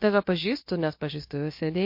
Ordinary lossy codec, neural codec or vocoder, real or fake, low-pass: MP3, 32 kbps; codec, 16 kHz, about 1 kbps, DyCAST, with the encoder's durations; fake; 5.4 kHz